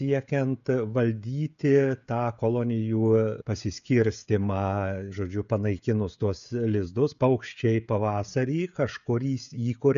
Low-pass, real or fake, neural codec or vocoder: 7.2 kHz; fake; codec, 16 kHz, 16 kbps, FreqCodec, smaller model